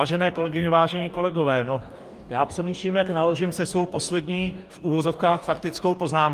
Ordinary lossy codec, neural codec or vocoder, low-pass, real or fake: Opus, 32 kbps; codec, 44.1 kHz, 2.6 kbps, DAC; 14.4 kHz; fake